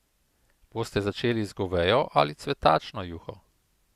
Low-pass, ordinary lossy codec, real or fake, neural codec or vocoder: 14.4 kHz; Opus, 64 kbps; real; none